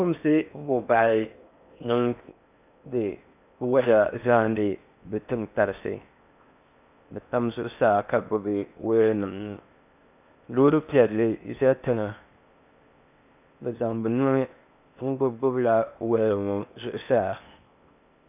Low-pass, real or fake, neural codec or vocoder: 3.6 kHz; fake; codec, 16 kHz in and 24 kHz out, 0.6 kbps, FocalCodec, streaming, 4096 codes